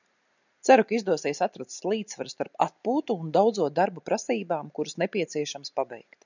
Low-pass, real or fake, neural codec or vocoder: 7.2 kHz; real; none